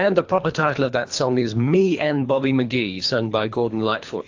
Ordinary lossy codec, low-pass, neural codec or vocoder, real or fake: AAC, 48 kbps; 7.2 kHz; codec, 24 kHz, 3 kbps, HILCodec; fake